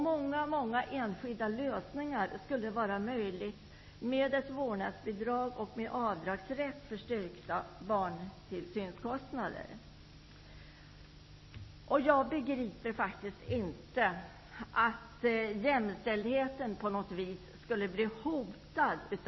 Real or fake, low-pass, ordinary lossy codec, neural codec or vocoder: real; 7.2 kHz; MP3, 24 kbps; none